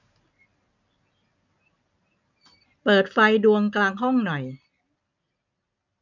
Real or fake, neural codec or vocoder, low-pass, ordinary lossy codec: real; none; 7.2 kHz; none